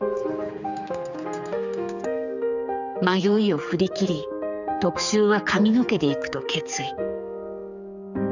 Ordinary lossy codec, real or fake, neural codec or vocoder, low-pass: none; fake; codec, 16 kHz, 4 kbps, X-Codec, HuBERT features, trained on general audio; 7.2 kHz